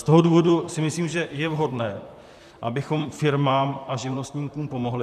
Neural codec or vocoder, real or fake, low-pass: vocoder, 44.1 kHz, 128 mel bands, Pupu-Vocoder; fake; 14.4 kHz